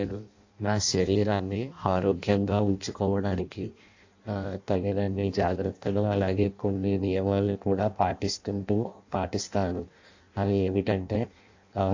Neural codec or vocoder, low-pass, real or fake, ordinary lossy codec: codec, 16 kHz in and 24 kHz out, 0.6 kbps, FireRedTTS-2 codec; 7.2 kHz; fake; AAC, 48 kbps